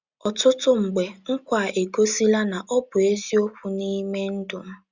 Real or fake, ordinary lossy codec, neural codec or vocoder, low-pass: real; Opus, 64 kbps; none; 7.2 kHz